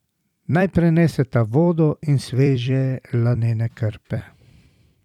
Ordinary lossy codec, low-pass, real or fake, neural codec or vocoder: none; 19.8 kHz; fake; vocoder, 44.1 kHz, 128 mel bands every 256 samples, BigVGAN v2